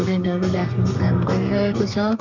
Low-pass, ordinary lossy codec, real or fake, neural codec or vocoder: 7.2 kHz; none; fake; codec, 32 kHz, 1.9 kbps, SNAC